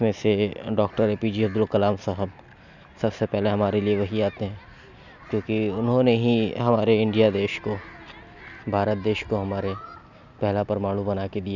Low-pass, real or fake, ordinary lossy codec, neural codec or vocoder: 7.2 kHz; real; none; none